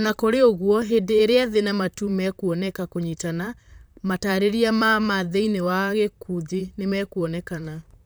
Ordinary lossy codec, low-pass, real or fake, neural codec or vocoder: none; none; fake; vocoder, 44.1 kHz, 128 mel bands, Pupu-Vocoder